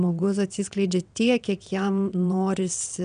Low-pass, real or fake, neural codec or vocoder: 9.9 kHz; fake; vocoder, 22.05 kHz, 80 mel bands, WaveNeXt